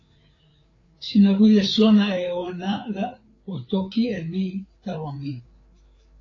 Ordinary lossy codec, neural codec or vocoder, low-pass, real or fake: AAC, 32 kbps; codec, 16 kHz, 4 kbps, FreqCodec, larger model; 7.2 kHz; fake